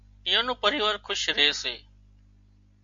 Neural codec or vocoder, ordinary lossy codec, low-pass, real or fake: none; AAC, 64 kbps; 7.2 kHz; real